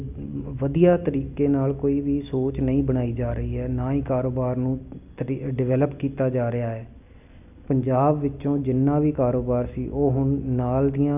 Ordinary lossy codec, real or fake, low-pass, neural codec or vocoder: none; real; 3.6 kHz; none